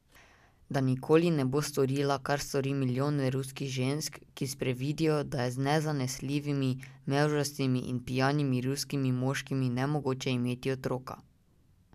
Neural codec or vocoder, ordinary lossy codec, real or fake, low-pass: none; none; real; 14.4 kHz